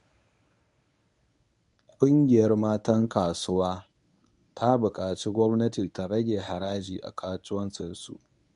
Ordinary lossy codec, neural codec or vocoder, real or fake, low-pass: none; codec, 24 kHz, 0.9 kbps, WavTokenizer, medium speech release version 1; fake; 10.8 kHz